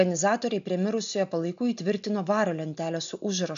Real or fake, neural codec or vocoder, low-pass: real; none; 7.2 kHz